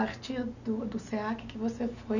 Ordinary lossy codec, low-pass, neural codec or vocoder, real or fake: none; 7.2 kHz; none; real